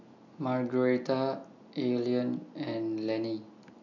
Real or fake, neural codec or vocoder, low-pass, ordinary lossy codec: real; none; 7.2 kHz; none